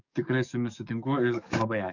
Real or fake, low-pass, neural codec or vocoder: fake; 7.2 kHz; codec, 44.1 kHz, 7.8 kbps, DAC